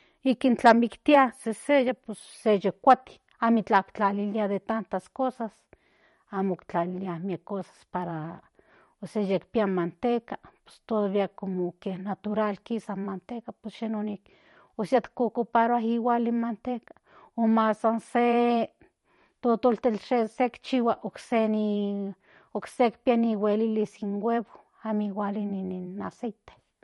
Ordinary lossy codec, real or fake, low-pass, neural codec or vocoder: MP3, 48 kbps; fake; 19.8 kHz; vocoder, 44.1 kHz, 128 mel bands every 512 samples, BigVGAN v2